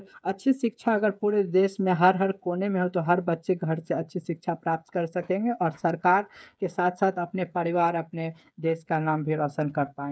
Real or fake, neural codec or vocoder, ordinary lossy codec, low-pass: fake; codec, 16 kHz, 8 kbps, FreqCodec, smaller model; none; none